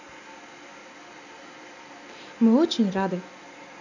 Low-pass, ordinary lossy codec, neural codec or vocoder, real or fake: 7.2 kHz; none; none; real